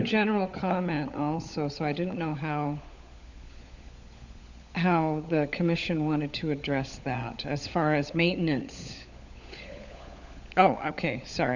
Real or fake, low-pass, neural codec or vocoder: fake; 7.2 kHz; codec, 16 kHz, 16 kbps, FunCodec, trained on LibriTTS, 50 frames a second